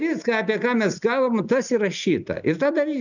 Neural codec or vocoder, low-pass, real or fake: none; 7.2 kHz; real